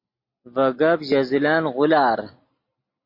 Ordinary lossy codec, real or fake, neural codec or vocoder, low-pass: MP3, 32 kbps; real; none; 5.4 kHz